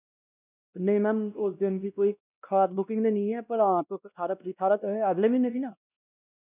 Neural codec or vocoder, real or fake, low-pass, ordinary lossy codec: codec, 16 kHz, 1 kbps, X-Codec, WavLM features, trained on Multilingual LibriSpeech; fake; 3.6 kHz; none